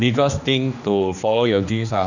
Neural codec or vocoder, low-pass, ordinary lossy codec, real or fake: codec, 16 kHz, 2 kbps, X-Codec, HuBERT features, trained on general audio; 7.2 kHz; none; fake